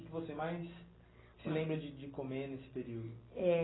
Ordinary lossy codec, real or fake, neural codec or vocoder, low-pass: AAC, 16 kbps; real; none; 7.2 kHz